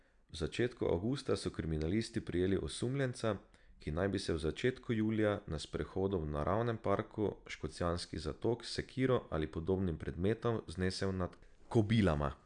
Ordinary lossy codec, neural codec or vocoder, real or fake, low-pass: none; none; real; 9.9 kHz